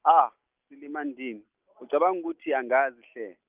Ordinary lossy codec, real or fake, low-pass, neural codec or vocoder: Opus, 24 kbps; real; 3.6 kHz; none